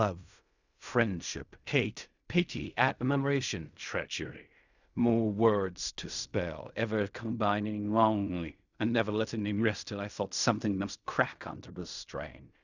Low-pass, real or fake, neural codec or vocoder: 7.2 kHz; fake; codec, 16 kHz in and 24 kHz out, 0.4 kbps, LongCat-Audio-Codec, fine tuned four codebook decoder